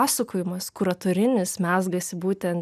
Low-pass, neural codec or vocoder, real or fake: 14.4 kHz; none; real